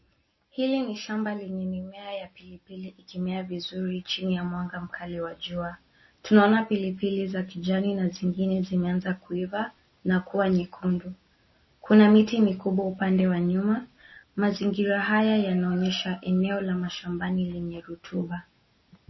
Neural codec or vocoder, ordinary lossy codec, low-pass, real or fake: none; MP3, 24 kbps; 7.2 kHz; real